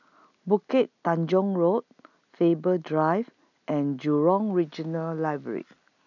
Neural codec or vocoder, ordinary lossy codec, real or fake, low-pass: none; none; real; 7.2 kHz